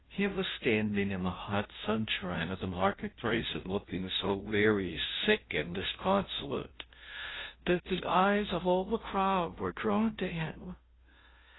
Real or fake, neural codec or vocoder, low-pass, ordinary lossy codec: fake; codec, 16 kHz, 0.5 kbps, FunCodec, trained on Chinese and English, 25 frames a second; 7.2 kHz; AAC, 16 kbps